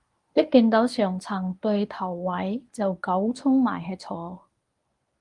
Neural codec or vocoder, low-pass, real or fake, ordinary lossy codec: codec, 24 kHz, 0.9 kbps, WavTokenizer, medium speech release version 1; 10.8 kHz; fake; Opus, 32 kbps